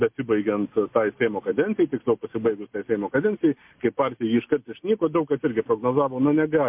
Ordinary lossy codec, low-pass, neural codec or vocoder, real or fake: MP3, 32 kbps; 3.6 kHz; none; real